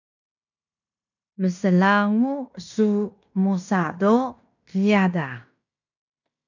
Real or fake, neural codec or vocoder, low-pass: fake; codec, 16 kHz in and 24 kHz out, 0.9 kbps, LongCat-Audio-Codec, fine tuned four codebook decoder; 7.2 kHz